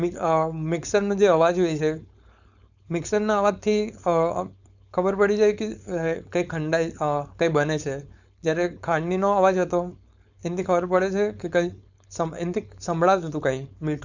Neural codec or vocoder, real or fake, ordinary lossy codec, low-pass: codec, 16 kHz, 4.8 kbps, FACodec; fake; none; 7.2 kHz